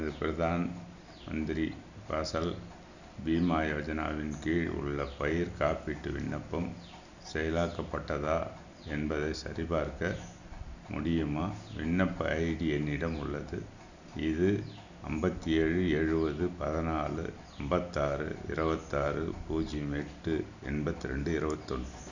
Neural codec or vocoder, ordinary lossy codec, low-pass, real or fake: none; none; 7.2 kHz; real